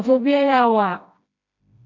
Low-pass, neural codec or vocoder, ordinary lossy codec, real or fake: 7.2 kHz; codec, 16 kHz, 1 kbps, FreqCodec, smaller model; MP3, 48 kbps; fake